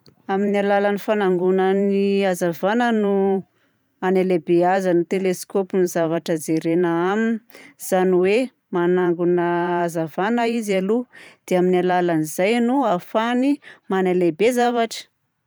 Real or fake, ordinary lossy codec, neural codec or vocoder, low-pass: fake; none; vocoder, 44.1 kHz, 128 mel bands every 512 samples, BigVGAN v2; none